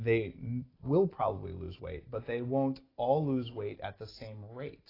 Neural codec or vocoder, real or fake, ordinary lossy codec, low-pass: none; real; AAC, 24 kbps; 5.4 kHz